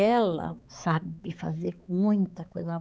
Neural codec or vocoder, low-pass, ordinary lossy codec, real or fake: codec, 16 kHz, 4 kbps, X-Codec, HuBERT features, trained on balanced general audio; none; none; fake